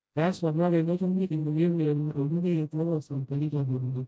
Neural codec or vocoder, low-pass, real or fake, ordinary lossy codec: codec, 16 kHz, 0.5 kbps, FreqCodec, smaller model; none; fake; none